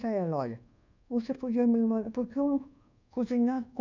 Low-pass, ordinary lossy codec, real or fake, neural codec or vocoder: 7.2 kHz; none; fake; codec, 24 kHz, 1.2 kbps, DualCodec